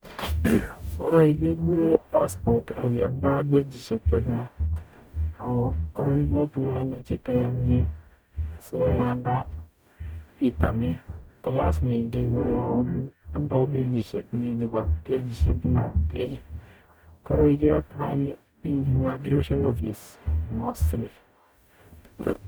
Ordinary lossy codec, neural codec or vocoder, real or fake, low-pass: none; codec, 44.1 kHz, 0.9 kbps, DAC; fake; none